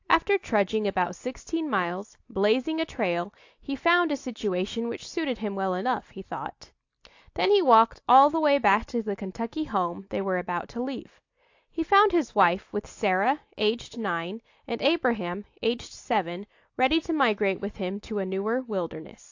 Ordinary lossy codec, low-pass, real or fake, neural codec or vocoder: AAC, 48 kbps; 7.2 kHz; real; none